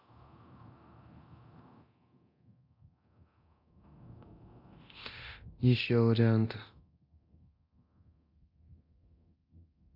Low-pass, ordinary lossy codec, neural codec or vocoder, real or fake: 5.4 kHz; none; codec, 24 kHz, 0.9 kbps, DualCodec; fake